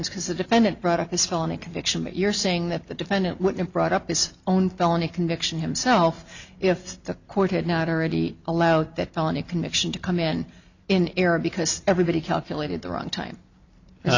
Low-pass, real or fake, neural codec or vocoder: 7.2 kHz; real; none